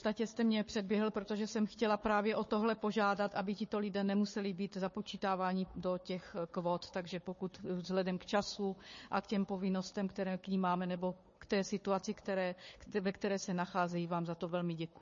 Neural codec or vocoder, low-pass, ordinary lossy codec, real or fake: codec, 16 kHz, 4 kbps, FunCodec, trained on Chinese and English, 50 frames a second; 7.2 kHz; MP3, 32 kbps; fake